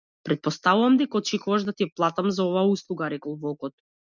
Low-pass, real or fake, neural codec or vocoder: 7.2 kHz; real; none